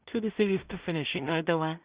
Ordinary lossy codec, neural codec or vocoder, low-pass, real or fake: Opus, 64 kbps; codec, 16 kHz in and 24 kHz out, 0.4 kbps, LongCat-Audio-Codec, two codebook decoder; 3.6 kHz; fake